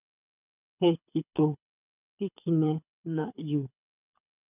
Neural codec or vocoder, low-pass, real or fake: codec, 24 kHz, 6 kbps, HILCodec; 3.6 kHz; fake